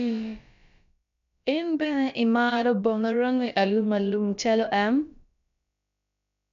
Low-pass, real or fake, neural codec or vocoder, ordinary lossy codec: 7.2 kHz; fake; codec, 16 kHz, about 1 kbps, DyCAST, with the encoder's durations; AAC, 96 kbps